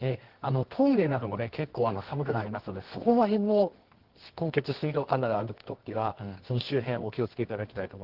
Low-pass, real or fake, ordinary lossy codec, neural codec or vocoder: 5.4 kHz; fake; Opus, 16 kbps; codec, 24 kHz, 0.9 kbps, WavTokenizer, medium music audio release